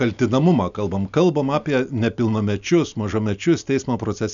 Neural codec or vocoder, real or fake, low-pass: none; real; 7.2 kHz